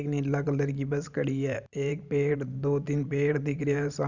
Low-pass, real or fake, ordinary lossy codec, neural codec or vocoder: 7.2 kHz; fake; none; vocoder, 44.1 kHz, 128 mel bands every 512 samples, BigVGAN v2